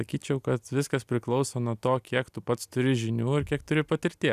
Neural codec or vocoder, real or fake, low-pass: none; real; 14.4 kHz